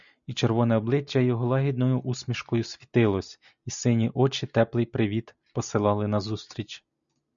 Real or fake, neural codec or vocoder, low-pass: real; none; 7.2 kHz